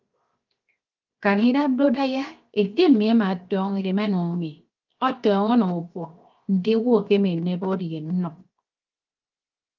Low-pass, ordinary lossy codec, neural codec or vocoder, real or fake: 7.2 kHz; Opus, 32 kbps; codec, 16 kHz, 0.7 kbps, FocalCodec; fake